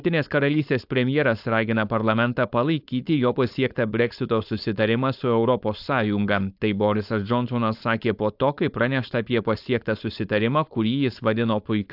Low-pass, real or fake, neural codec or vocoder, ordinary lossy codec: 5.4 kHz; fake; codec, 16 kHz, 4.8 kbps, FACodec; AAC, 48 kbps